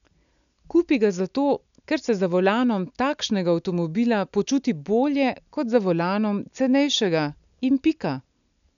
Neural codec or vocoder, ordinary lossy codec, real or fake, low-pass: none; none; real; 7.2 kHz